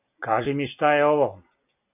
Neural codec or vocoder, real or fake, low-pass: none; real; 3.6 kHz